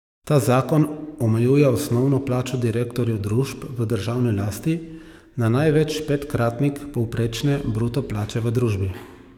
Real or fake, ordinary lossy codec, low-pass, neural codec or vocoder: fake; none; 19.8 kHz; codec, 44.1 kHz, 7.8 kbps, DAC